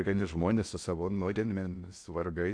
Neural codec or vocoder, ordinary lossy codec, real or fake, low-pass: codec, 16 kHz in and 24 kHz out, 0.6 kbps, FocalCodec, streaming, 4096 codes; AAC, 64 kbps; fake; 9.9 kHz